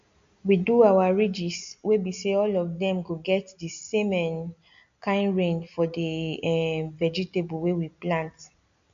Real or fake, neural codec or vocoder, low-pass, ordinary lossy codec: real; none; 7.2 kHz; MP3, 64 kbps